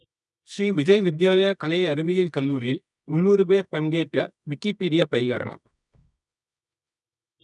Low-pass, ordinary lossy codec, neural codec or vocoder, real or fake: 10.8 kHz; none; codec, 24 kHz, 0.9 kbps, WavTokenizer, medium music audio release; fake